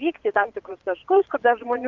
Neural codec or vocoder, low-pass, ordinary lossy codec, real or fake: vocoder, 22.05 kHz, 80 mel bands, Vocos; 7.2 kHz; Opus, 32 kbps; fake